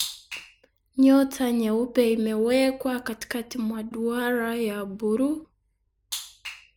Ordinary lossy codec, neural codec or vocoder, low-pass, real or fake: none; none; none; real